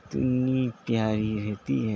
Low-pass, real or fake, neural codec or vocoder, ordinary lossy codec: none; real; none; none